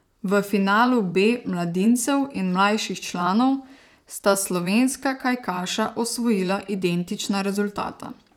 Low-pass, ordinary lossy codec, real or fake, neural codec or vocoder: 19.8 kHz; none; fake; vocoder, 44.1 kHz, 128 mel bands, Pupu-Vocoder